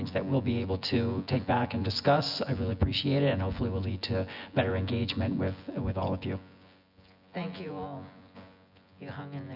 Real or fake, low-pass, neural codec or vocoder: fake; 5.4 kHz; vocoder, 24 kHz, 100 mel bands, Vocos